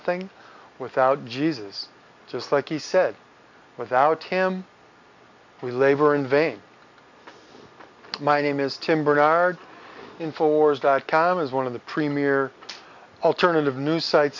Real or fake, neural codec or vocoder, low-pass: real; none; 7.2 kHz